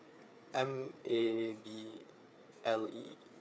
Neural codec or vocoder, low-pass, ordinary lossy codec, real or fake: codec, 16 kHz, 4 kbps, FreqCodec, larger model; none; none; fake